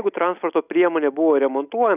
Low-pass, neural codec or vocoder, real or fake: 3.6 kHz; none; real